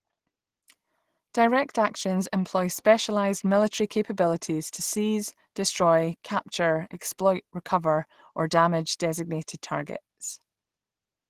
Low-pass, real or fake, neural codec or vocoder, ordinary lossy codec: 14.4 kHz; real; none; Opus, 16 kbps